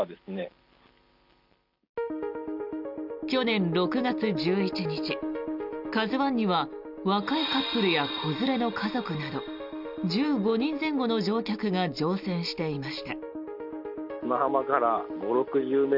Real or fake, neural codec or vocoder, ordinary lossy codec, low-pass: real; none; none; 5.4 kHz